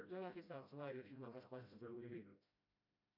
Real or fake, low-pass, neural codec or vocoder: fake; 5.4 kHz; codec, 16 kHz, 0.5 kbps, FreqCodec, smaller model